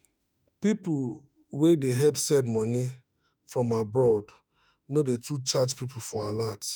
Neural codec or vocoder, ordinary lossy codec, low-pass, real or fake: autoencoder, 48 kHz, 32 numbers a frame, DAC-VAE, trained on Japanese speech; none; none; fake